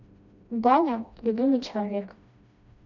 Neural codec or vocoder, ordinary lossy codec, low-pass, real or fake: codec, 16 kHz, 1 kbps, FreqCodec, smaller model; none; 7.2 kHz; fake